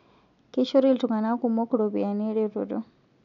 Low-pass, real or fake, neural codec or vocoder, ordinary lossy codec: 7.2 kHz; real; none; none